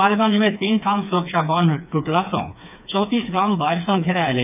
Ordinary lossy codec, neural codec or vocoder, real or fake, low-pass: none; codec, 16 kHz, 4 kbps, FreqCodec, smaller model; fake; 3.6 kHz